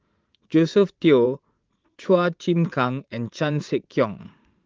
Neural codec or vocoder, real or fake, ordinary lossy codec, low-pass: vocoder, 44.1 kHz, 80 mel bands, Vocos; fake; Opus, 32 kbps; 7.2 kHz